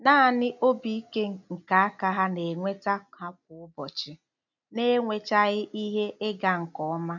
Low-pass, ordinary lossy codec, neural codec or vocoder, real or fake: 7.2 kHz; none; none; real